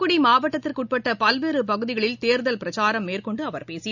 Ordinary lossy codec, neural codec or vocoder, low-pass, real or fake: none; none; 7.2 kHz; real